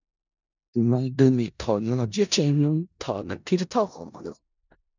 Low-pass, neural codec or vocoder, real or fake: 7.2 kHz; codec, 16 kHz in and 24 kHz out, 0.4 kbps, LongCat-Audio-Codec, four codebook decoder; fake